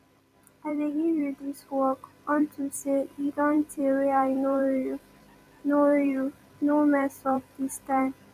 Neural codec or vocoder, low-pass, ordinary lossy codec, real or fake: vocoder, 44.1 kHz, 128 mel bands every 256 samples, BigVGAN v2; 14.4 kHz; none; fake